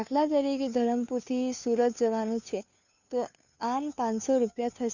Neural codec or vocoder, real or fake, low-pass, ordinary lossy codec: codec, 16 kHz, 2 kbps, FunCodec, trained on Chinese and English, 25 frames a second; fake; 7.2 kHz; none